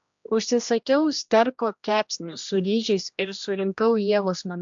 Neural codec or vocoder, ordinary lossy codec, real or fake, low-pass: codec, 16 kHz, 1 kbps, X-Codec, HuBERT features, trained on general audio; AAC, 64 kbps; fake; 7.2 kHz